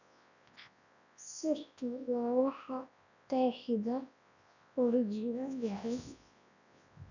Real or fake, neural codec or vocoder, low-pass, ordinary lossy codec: fake; codec, 24 kHz, 0.9 kbps, WavTokenizer, large speech release; 7.2 kHz; none